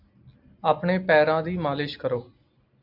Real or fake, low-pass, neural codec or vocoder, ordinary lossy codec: real; 5.4 kHz; none; MP3, 48 kbps